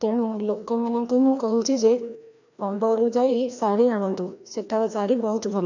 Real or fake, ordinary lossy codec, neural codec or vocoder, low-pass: fake; none; codec, 16 kHz, 1 kbps, FreqCodec, larger model; 7.2 kHz